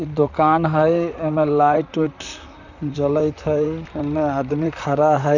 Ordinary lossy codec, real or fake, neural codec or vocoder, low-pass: none; fake; vocoder, 44.1 kHz, 128 mel bands, Pupu-Vocoder; 7.2 kHz